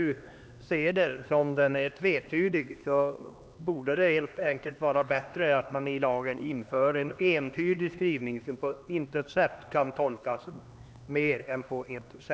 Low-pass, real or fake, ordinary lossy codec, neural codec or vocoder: none; fake; none; codec, 16 kHz, 2 kbps, X-Codec, HuBERT features, trained on LibriSpeech